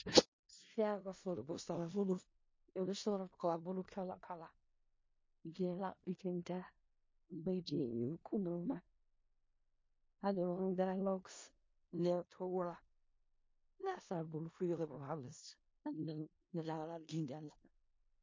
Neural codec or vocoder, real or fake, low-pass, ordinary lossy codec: codec, 16 kHz in and 24 kHz out, 0.4 kbps, LongCat-Audio-Codec, four codebook decoder; fake; 7.2 kHz; MP3, 32 kbps